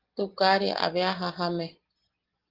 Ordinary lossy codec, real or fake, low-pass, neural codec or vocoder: Opus, 16 kbps; real; 5.4 kHz; none